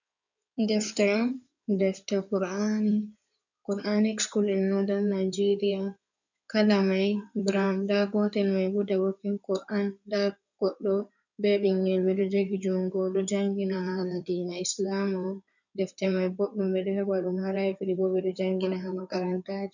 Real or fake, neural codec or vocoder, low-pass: fake; codec, 16 kHz in and 24 kHz out, 2.2 kbps, FireRedTTS-2 codec; 7.2 kHz